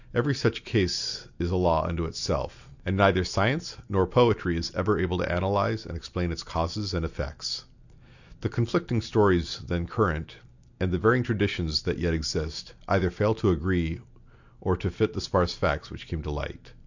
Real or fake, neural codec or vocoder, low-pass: real; none; 7.2 kHz